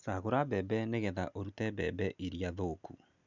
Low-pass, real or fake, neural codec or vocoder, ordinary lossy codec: 7.2 kHz; real; none; none